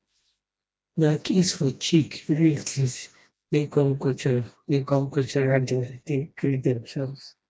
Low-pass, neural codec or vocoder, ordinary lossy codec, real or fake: none; codec, 16 kHz, 1 kbps, FreqCodec, smaller model; none; fake